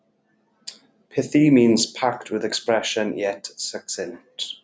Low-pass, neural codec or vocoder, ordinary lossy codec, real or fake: none; none; none; real